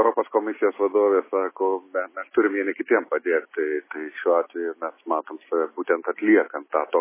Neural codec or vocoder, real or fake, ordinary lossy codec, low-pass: none; real; MP3, 16 kbps; 3.6 kHz